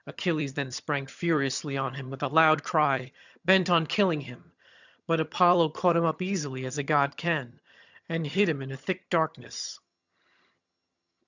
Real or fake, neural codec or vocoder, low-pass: fake; vocoder, 22.05 kHz, 80 mel bands, HiFi-GAN; 7.2 kHz